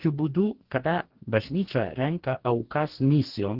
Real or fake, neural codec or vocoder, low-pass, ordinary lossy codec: fake; codec, 44.1 kHz, 2.6 kbps, DAC; 5.4 kHz; Opus, 16 kbps